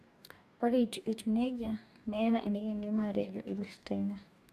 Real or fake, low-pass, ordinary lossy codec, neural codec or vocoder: fake; 14.4 kHz; none; codec, 44.1 kHz, 2.6 kbps, DAC